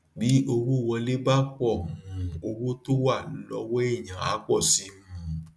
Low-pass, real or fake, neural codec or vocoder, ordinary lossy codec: none; real; none; none